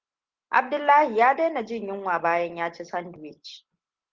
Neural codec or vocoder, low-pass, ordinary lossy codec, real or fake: none; 7.2 kHz; Opus, 16 kbps; real